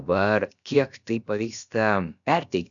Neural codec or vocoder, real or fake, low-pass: codec, 16 kHz, about 1 kbps, DyCAST, with the encoder's durations; fake; 7.2 kHz